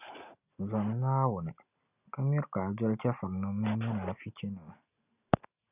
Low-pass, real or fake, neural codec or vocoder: 3.6 kHz; real; none